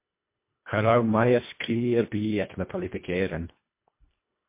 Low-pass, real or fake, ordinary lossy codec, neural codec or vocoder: 3.6 kHz; fake; MP3, 24 kbps; codec, 24 kHz, 1.5 kbps, HILCodec